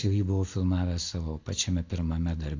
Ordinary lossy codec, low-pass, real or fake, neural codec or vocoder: AAC, 48 kbps; 7.2 kHz; real; none